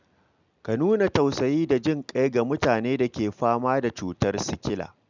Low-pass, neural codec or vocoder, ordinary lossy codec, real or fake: 7.2 kHz; none; none; real